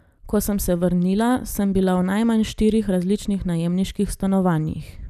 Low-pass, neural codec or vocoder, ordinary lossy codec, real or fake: 14.4 kHz; none; none; real